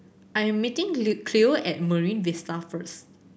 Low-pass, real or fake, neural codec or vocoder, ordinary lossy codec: none; real; none; none